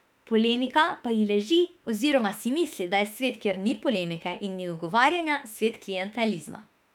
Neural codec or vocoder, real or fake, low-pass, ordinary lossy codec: autoencoder, 48 kHz, 32 numbers a frame, DAC-VAE, trained on Japanese speech; fake; 19.8 kHz; none